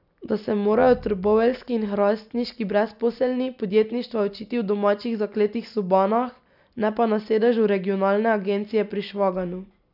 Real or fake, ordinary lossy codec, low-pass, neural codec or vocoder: real; none; 5.4 kHz; none